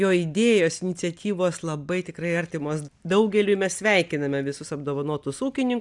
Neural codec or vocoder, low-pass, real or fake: none; 10.8 kHz; real